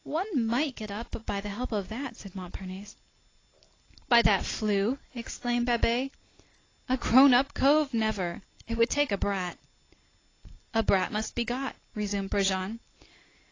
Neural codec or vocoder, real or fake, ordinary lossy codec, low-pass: none; real; AAC, 32 kbps; 7.2 kHz